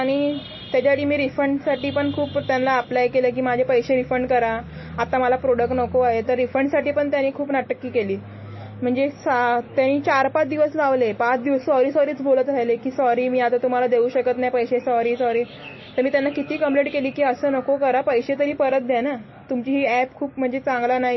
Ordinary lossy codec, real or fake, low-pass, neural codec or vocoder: MP3, 24 kbps; real; 7.2 kHz; none